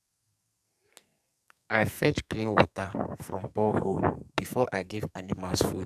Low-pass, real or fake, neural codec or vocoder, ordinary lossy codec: 14.4 kHz; fake; codec, 44.1 kHz, 2.6 kbps, SNAC; none